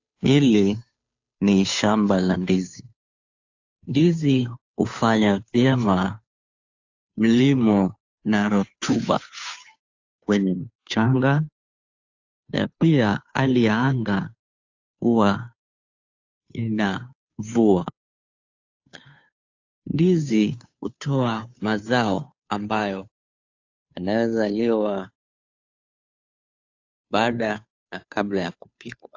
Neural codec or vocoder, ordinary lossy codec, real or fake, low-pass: codec, 16 kHz, 2 kbps, FunCodec, trained on Chinese and English, 25 frames a second; AAC, 48 kbps; fake; 7.2 kHz